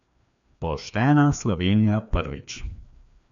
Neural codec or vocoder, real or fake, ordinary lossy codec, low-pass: codec, 16 kHz, 2 kbps, FreqCodec, larger model; fake; none; 7.2 kHz